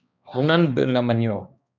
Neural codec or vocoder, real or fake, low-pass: codec, 16 kHz, 2 kbps, X-Codec, HuBERT features, trained on balanced general audio; fake; 7.2 kHz